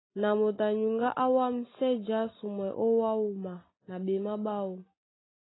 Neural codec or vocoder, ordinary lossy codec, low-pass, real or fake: none; AAC, 16 kbps; 7.2 kHz; real